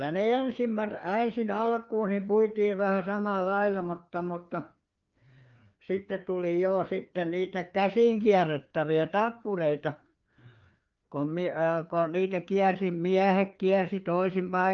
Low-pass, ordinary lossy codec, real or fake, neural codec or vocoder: 7.2 kHz; Opus, 24 kbps; fake; codec, 16 kHz, 2 kbps, FreqCodec, larger model